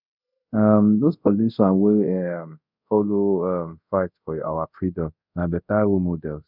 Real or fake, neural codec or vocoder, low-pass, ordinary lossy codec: fake; codec, 24 kHz, 0.5 kbps, DualCodec; 5.4 kHz; none